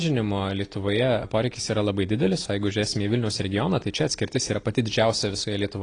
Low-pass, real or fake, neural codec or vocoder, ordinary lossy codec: 9.9 kHz; real; none; AAC, 32 kbps